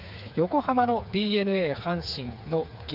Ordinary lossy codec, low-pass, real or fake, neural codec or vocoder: none; 5.4 kHz; fake; codec, 16 kHz, 4 kbps, FreqCodec, smaller model